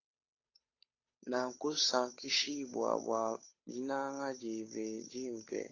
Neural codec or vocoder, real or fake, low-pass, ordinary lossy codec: codec, 16 kHz, 8 kbps, FunCodec, trained on Chinese and English, 25 frames a second; fake; 7.2 kHz; AAC, 32 kbps